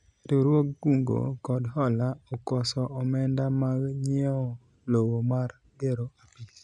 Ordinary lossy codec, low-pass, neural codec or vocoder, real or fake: none; 10.8 kHz; none; real